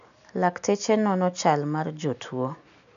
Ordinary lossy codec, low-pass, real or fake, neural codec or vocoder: none; 7.2 kHz; real; none